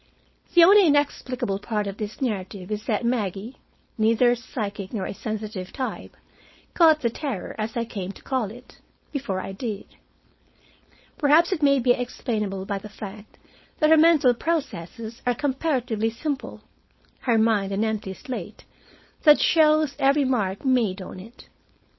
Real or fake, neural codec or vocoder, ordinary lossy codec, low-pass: fake; codec, 16 kHz, 4.8 kbps, FACodec; MP3, 24 kbps; 7.2 kHz